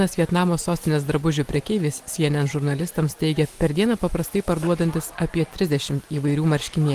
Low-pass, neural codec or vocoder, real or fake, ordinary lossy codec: 14.4 kHz; none; real; Opus, 24 kbps